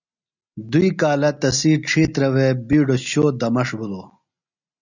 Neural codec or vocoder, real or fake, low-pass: none; real; 7.2 kHz